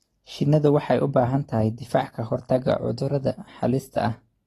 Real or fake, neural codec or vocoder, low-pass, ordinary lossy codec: real; none; 19.8 kHz; AAC, 32 kbps